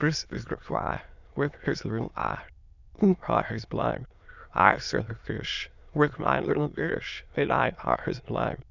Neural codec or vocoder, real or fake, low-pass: autoencoder, 22.05 kHz, a latent of 192 numbers a frame, VITS, trained on many speakers; fake; 7.2 kHz